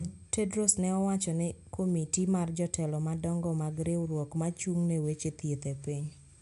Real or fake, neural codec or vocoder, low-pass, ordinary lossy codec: real; none; 10.8 kHz; none